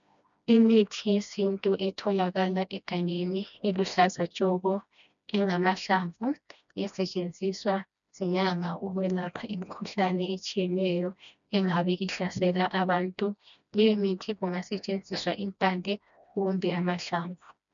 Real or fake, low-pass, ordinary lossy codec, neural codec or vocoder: fake; 7.2 kHz; MP3, 96 kbps; codec, 16 kHz, 1 kbps, FreqCodec, smaller model